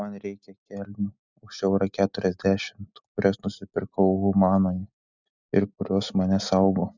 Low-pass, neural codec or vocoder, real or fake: 7.2 kHz; none; real